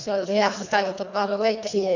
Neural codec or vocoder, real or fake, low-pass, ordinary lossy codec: codec, 24 kHz, 1.5 kbps, HILCodec; fake; 7.2 kHz; none